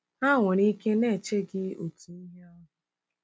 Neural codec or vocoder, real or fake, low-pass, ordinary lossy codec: none; real; none; none